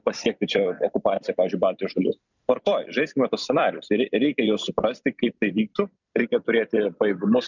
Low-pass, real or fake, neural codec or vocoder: 7.2 kHz; fake; vocoder, 44.1 kHz, 128 mel bands every 256 samples, BigVGAN v2